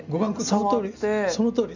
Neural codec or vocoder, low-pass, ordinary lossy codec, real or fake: none; 7.2 kHz; none; real